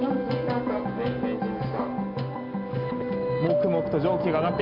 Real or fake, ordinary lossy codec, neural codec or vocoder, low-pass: real; none; none; 5.4 kHz